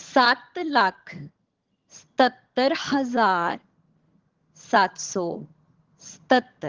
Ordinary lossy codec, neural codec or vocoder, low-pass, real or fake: Opus, 16 kbps; vocoder, 22.05 kHz, 80 mel bands, HiFi-GAN; 7.2 kHz; fake